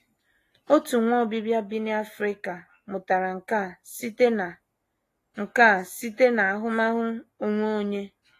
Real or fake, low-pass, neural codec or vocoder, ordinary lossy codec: real; 14.4 kHz; none; AAC, 48 kbps